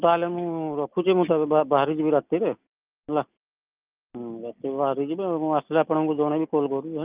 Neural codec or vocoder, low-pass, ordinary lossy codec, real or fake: none; 3.6 kHz; Opus, 24 kbps; real